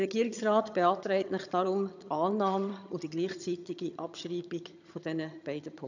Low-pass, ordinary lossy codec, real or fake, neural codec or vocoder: 7.2 kHz; none; fake; vocoder, 22.05 kHz, 80 mel bands, HiFi-GAN